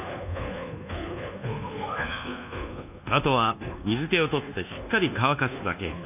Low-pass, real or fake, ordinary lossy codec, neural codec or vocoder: 3.6 kHz; fake; none; codec, 24 kHz, 1.2 kbps, DualCodec